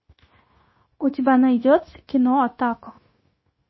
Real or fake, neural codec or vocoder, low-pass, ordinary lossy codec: fake; codec, 16 kHz, 0.9 kbps, LongCat-Audio-Codec; 7.2 kHz; MP3, 24 kbps